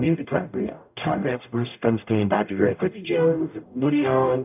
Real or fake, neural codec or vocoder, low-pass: fake; codec, 44.1 kHz, 0.9 kbps, DAC; 3.6 kHz